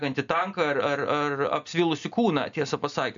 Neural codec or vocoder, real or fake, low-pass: none; real; 7.2 kHz